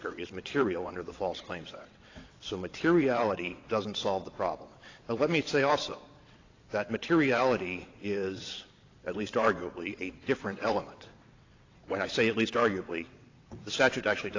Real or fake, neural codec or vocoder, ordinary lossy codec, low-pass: fake; vocoder, 22.05 kHz, 80 mel bands, WaveNeXt; AAC, 32 kbps; 7.2 kHz